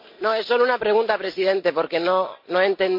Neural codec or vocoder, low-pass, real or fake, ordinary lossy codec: none; 5.4 kHz; real; MP3, 32 kbps